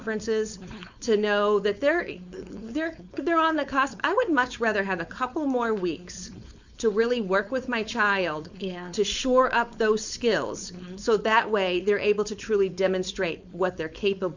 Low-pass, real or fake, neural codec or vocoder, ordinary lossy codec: 7.2 kHz; fake; codec, 16 kHz, 4.8 kbps, FACodec; Opus, 64 kbps